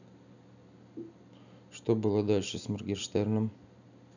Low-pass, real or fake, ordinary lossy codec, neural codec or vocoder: 7.2 kHz; real; none; none